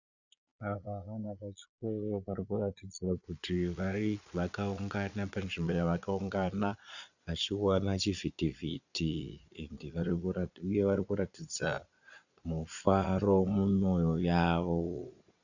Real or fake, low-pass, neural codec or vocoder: fake; 7.2 kHz; vocoder, 22.05 kHz, 80 mel bands, Vocos